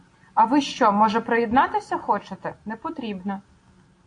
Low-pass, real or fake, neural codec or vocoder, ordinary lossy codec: 9.9 kHz; real; none; AAC, 48 kbps